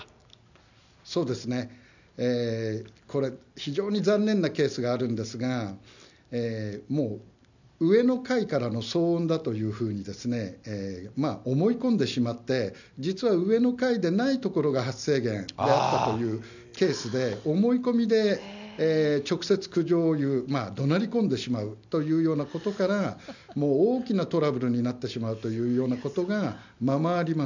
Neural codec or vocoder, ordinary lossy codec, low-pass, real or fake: none; none; 7.2 kHz; real